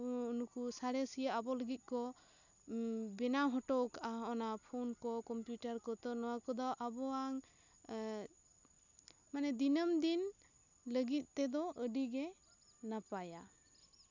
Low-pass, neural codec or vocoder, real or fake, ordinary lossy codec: 7.2 kHz; none; real; none